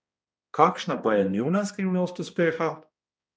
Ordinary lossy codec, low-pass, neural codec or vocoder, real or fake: none; none; codec, 16 kHz, 1 kbps, X-Codec, HuBERT features, trained on balanced general audio; fake